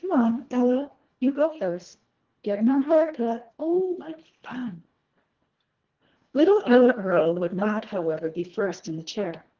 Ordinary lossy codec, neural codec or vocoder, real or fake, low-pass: Opus, 16 kbps; codec, 24 kHz, 1.5 kbps, HILCodec; fake; 7.2 kHz